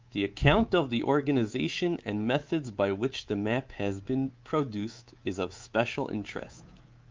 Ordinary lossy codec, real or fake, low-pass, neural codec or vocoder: Opus, 24 kbps; fake; 7.2 kHz; codec, 24 kHz, 3.1 kbps, DualCodec